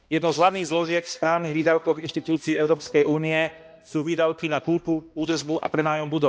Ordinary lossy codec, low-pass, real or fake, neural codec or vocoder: none; none; fake; codec, 16 kHz, 1 kbps, X-Codec, HuBERT features, trained on balanced general audio